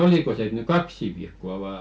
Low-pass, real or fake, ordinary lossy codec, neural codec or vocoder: none; real; none; none